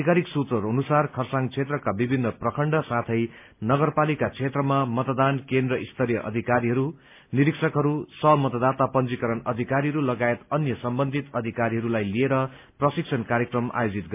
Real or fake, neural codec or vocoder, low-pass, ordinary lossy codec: real; none; 3.6 kHz; MP3, 32 kbps